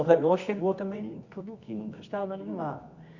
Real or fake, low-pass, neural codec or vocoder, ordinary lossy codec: fake; 7.2 kHz; codec, 24 kHz, 0.9 kbps, WavTokenizer, medium music audio release; Opus, 64 kbps